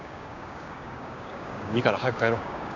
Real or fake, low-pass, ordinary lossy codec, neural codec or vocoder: fake; 7.2 kHz; none; codec, 16 kHz, 6 kbps, DAC